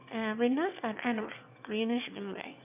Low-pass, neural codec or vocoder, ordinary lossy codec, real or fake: 3.6 kHz; autoencoder, 22.05 kHz, a latent of 192 numbers a frame, VITS, trained on one speaker; none; fake